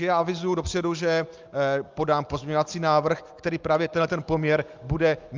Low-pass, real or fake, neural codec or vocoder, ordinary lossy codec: 7.2 kHz; real; none; Opus, 32 kbps